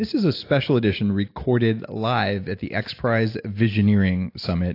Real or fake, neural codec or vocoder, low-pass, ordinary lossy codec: real; none; 5.4 kHz; AAC, 32 kbps